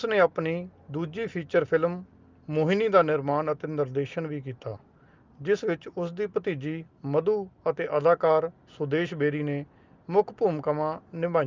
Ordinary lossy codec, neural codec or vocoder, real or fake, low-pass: Opus, 24 kbps; none; real; 7.2 kHz